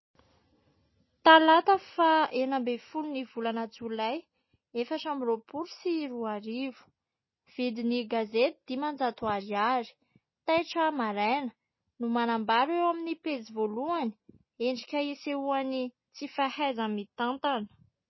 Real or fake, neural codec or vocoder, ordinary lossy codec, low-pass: real; none; MP3, 24 kbps; 7.2 kHz